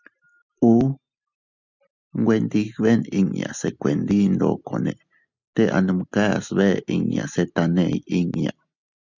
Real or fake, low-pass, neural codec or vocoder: real; 7.2 kHz; none